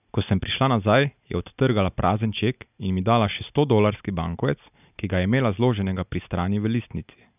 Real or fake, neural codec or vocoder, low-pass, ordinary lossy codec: real; none; 3.6 kHz; none